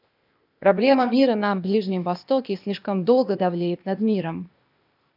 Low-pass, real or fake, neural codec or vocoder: 5.4 kHz; fake; codec, 16 kHz, 0.8 kbps, ZipCodec